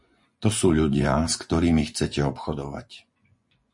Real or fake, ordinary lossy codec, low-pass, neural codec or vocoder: fake; MP3, 48 kbps; 10.8 kHz; vocoder, 24 kHz, 100 mel bands, Vocos